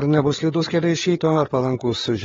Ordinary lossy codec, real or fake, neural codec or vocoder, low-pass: AAC, 24 kbps; fake; codec, 16 kHz, 8 kbps, FreqCodec, larger model; 7.2 kHz